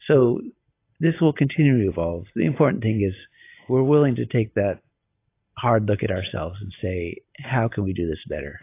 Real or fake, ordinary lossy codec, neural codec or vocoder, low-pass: real; AAC, 24 kbps; none; 3.6 kHz